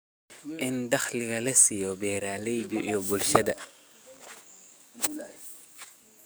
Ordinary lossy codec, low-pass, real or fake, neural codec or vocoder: none; none; fake; codec, 44.1 kHz, 7.8 kbps, DAC